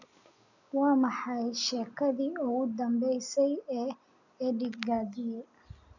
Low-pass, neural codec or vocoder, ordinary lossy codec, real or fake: 7.2 kHz; none; none; real